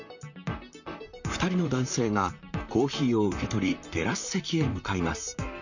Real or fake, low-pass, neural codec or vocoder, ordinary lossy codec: fake; 7.2 kHz; vocoder, 44.1 kHz, 128 mel bands, Pupu-Vocoder; none